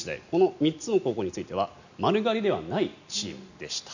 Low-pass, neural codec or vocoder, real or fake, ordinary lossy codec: 7.2 kHz; none; real; none